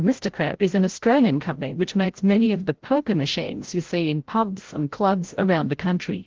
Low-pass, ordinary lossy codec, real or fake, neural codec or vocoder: 7.2 kHz; Opus, 16 kbps; fake; codec, 16 kHz, 0.5 kbps, FreqCodec, larger model